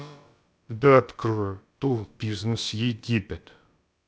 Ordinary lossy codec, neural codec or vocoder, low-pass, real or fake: none; codec, 16 kHz, about 1 kbps, DyCAST, with the encoder's durations; none; fake